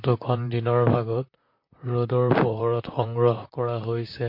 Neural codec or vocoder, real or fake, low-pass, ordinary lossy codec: vocoder, 44.1 kHz, 128 mel bands, Pupu-Vocoder; fake; 5.4 kHz; MP3, 32 kbps